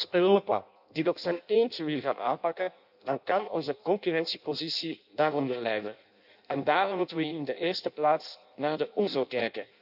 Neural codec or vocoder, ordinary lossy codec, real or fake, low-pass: codec, 16 kHz in and 24 kHz out, 0.6 kbps, FireRedTTS-2 codec; AAC, 48 kbps; fake; 5.4 kHz